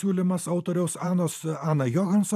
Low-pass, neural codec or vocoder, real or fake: 14.4 kHz; vocoder, 44.1 kHz, 128 mel bands every 512 samples, BigVGAN v2; fake